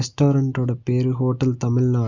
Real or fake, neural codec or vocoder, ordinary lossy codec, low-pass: real; none; Opus, 64 kbps; 7.2 kHz